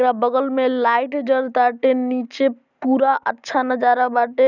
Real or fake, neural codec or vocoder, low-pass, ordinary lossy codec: real; none; 7.2 kHz; none